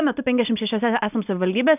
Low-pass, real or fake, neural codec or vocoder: 3.6 kHz; real; none